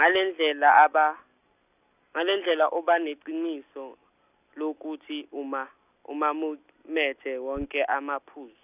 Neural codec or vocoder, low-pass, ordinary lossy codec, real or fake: none; 3.6 kHz; none; real